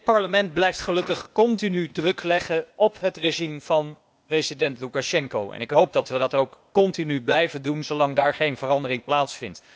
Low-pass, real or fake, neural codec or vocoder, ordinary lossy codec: none; fake; codec, 16 kHz, 0.8 kbps, ZipCodec; none